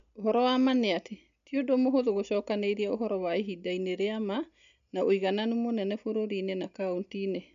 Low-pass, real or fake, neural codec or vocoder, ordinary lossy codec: 7.2 kHz; real; none; MP3, 96 kbps